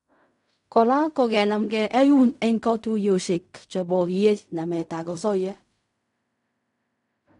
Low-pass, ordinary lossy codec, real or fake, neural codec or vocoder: 10.8 kHz; none; fake; codec, 16 kHz in and 24 kHz out, 0.4 kbps, LongCat-Audio-Codec, fine tuned four codebook decoder